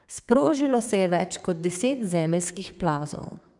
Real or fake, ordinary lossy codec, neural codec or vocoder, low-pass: fake; none; codec, 32 kHz, 1.9 kbps, SNAC; 10.8 kHz